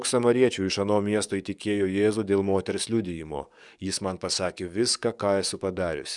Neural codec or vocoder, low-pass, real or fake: codec, 44.1 kHz, 7.8 kbps, DAC; 10.8 kHz; fake